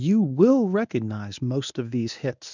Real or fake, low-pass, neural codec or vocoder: fake; 7.2 kHz; codec, 24 kHz, 0.9 kbps, WavTokenizer, medium speech release version 1